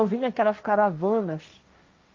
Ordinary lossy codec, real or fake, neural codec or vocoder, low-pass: Opus, 24 kbps; fake; codec, 16 kHz, 1.1 kbps, Voila-Tokenizer; 7.2 kHz